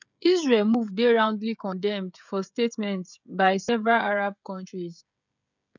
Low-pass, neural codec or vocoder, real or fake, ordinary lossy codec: 7.2 kHz; codec, 16 kHz, 16 kbps, FreqCodec, smaller model; fake; none